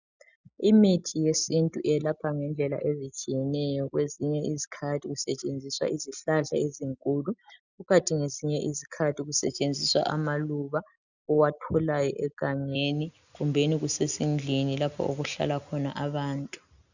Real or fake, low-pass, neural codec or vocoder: real; 7.2 kHz; none